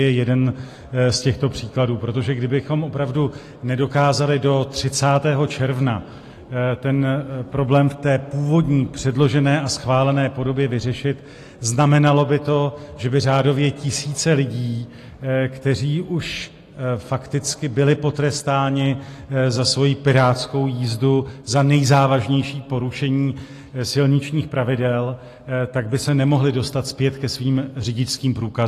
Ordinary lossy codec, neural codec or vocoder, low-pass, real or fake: AAC, 48 kbps; none; 14.4 kHz; real